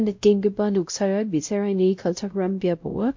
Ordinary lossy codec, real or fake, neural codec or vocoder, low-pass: MP3, 48 kbps; fake; codec, 16 kHz, 0.5 kbps, X-Codec, WavLM features, trained on Multilingual LibriSpeech; 7.2 kHz